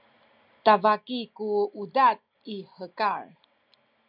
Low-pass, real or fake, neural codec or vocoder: 5.4 kHz; real; none